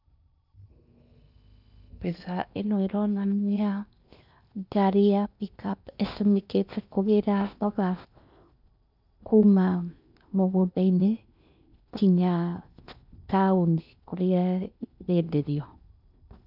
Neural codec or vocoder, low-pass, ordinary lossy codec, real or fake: codec, 16 kHz in and 24 kHz out, 0.8 kbps, FocalCodec, streaming, 65536 codes; 5.4 kHz; none; fake